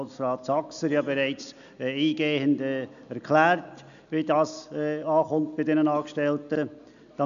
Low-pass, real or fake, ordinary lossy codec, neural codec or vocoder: 7.2 kHz; real; none; none